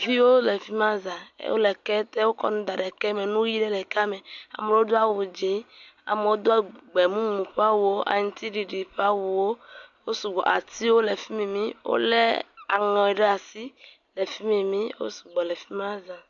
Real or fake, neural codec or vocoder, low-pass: real; none; 7.2 kHz